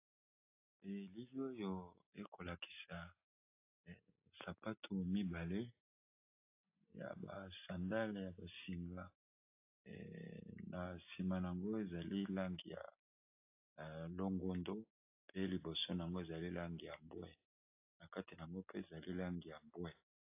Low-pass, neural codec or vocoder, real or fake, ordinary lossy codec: 3.6 kHz; none; real; AAC, 24 kbps